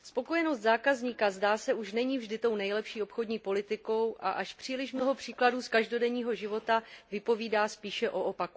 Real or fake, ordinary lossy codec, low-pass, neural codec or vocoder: real; none; none; none